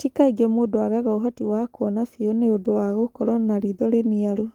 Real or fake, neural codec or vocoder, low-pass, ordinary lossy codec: fake; codec, 44.1 kHz, 7.8 kbps, Pupu-Codec; 19.8 kHz; Opus, 24 kbps